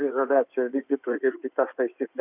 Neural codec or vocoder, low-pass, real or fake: codec, 16 kHz in and 24 kHz out, 2.2 kbps, FireRedTTS-2 codec; 3.6 kHz; fake